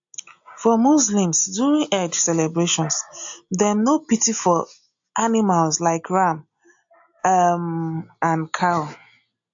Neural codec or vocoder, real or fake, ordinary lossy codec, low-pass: none; real; AAC, 64 kbps; 7.2 kHz